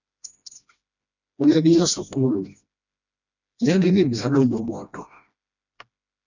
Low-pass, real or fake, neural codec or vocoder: 7.2 kHz; fake; codec, 16 kHz, 1 kbps, FreqCodec, smaller model